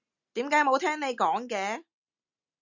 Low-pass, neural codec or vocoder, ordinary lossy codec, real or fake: 7.2 kHz; none; Opus, 64 kbps; real